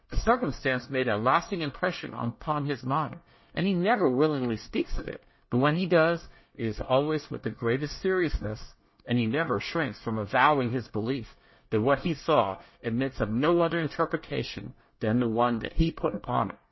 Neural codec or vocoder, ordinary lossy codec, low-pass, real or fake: codec, 24 kHz, 1 kbps, SNAC; MP3, 24 kbps; 7.2 kHz; fake